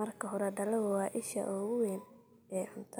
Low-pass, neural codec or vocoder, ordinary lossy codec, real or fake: none; none; none; real